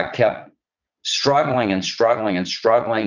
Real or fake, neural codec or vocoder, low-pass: fake; vocoder, 22.05 kHz, 80 mel bands, Vocos; 7.2 kHz